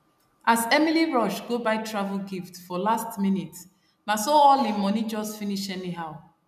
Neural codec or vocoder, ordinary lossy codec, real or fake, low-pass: none; none; real; 14.4 kHz